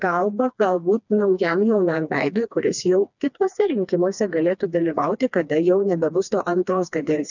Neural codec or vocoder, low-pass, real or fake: codec, 16 kHz, 2 kbps, FreqCodec, smaller model; 7.2 kHz; fake